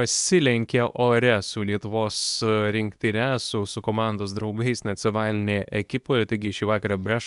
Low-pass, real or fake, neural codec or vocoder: 10.8 kHz; fake; codec, 24 kHz, 0.9 kbps, WavTokenizer, medium speech release version 2